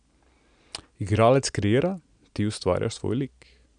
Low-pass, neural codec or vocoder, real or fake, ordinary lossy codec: 9.9 kHz; none; real; none